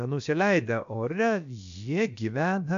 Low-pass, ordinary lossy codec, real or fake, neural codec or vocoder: 7.2 kHz; MP3, 64 kbps; fake; codec, 16 kHz, about 1 kbps, DyCAST, with the encoder's durations